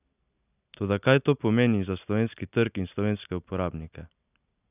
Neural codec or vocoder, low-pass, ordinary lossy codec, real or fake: none; 3.6 kHz; none; real